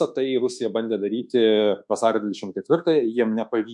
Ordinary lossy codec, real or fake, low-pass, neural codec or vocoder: MP3, 64 kbps; fake; 10.8 kHz; codec, 24 kHz, 1.2 kbps, DualCodec